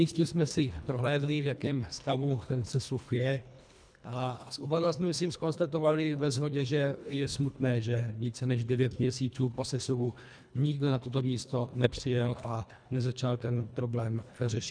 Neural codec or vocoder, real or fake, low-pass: codec, 24 kHz, 1.5 kbps, HILCodec; fake; 9.9 kHz